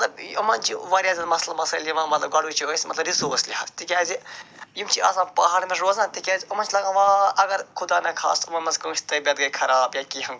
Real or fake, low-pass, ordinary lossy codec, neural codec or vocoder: real; none; none; none